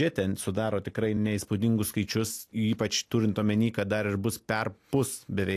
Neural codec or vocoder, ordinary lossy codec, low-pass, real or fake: none; AAC, 64 kbps; 14.4 kHz; real